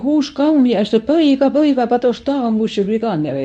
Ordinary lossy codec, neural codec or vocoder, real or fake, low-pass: none; codec, 24 kHz, 0.9 kbps, WavTokenizer, medium speech release version 1; fake; 10.8 kHz